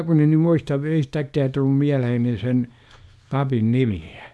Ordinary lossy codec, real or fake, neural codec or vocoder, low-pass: none; fake; codec, 24 kHz, 0.9 kbps, WavTokenizer, small release; none